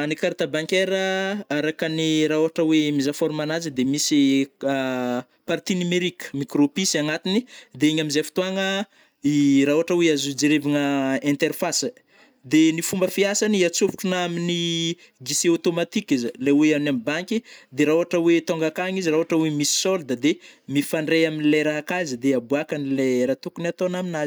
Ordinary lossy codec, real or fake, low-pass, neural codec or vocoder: none; real; none; none